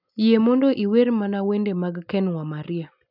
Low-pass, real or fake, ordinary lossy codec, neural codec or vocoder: 5.4 kHz; real; none; none